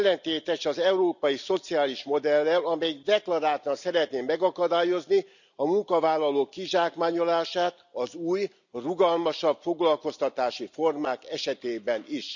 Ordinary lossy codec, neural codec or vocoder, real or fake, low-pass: none; none; real; 7.2 kHz